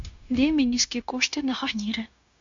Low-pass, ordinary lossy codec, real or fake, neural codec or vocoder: 7.2 kHz; MP3, 64 kbps; fake; codec, 16 kHz, 0.9 kbps, LongCat-Audio-Codec